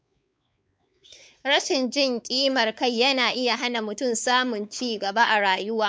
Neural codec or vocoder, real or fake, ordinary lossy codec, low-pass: codec, 16 kHz, 4 kbps, X-Codec, WavLM features, trained on Multilingual LibriSpeech; fake; none; none